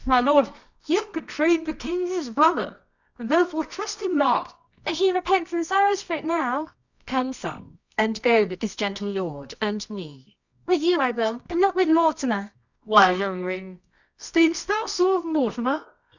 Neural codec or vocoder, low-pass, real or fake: codec, 24 kHz, 0.9 kbps, WavTokenizer, medium music audio release; 7.2 kHz; fake